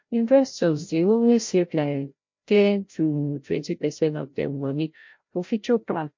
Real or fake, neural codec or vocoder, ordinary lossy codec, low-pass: fake; codec, 16 kHz, 0.5 kbps, FreqCodec, larger model; MP3, 48 kbps; 7.2 kHz